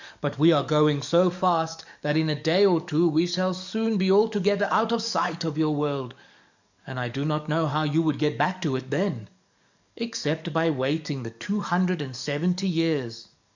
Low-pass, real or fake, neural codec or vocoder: 7.2 kHz; fake; codec, 44.1 kHz, 7.8 kbps, DAC